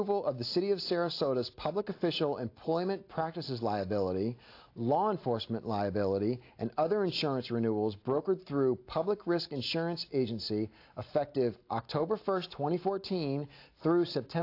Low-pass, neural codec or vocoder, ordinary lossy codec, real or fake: 5.4 kHz; none; AAC, 32 kbps; real